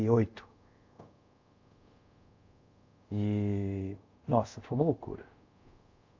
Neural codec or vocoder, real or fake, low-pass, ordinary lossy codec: codec, 24 kHz, 0.5 kbps, DualCodec; fake; 7.2 kHz; MP3, 64 kbps